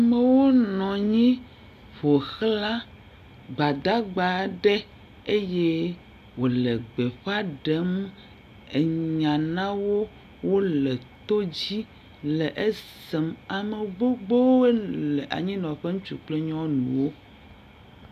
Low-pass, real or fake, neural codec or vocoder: 14.4 kHz; real; none